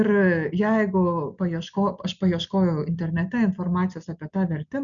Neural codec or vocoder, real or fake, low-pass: none; real; 7.2 kHz